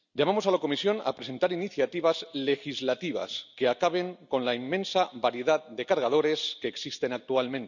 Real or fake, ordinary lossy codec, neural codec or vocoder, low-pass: real; none; none; 7.2 kHz